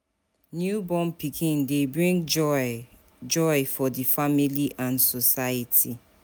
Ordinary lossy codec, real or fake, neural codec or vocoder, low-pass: none; real; none; none